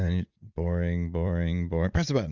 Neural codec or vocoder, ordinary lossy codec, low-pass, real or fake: vocoder, 44.1 kHz, 80 mel bands, Vocos; Opus, 64 kbps; 7.2 kHz; fake